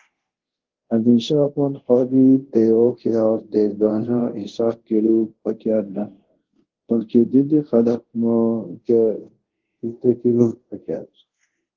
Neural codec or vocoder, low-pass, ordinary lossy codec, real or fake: codec, 24 kHz, 0.5 kbps, DualCodec; 7.2 kHz; Opus, 16 kbps; fake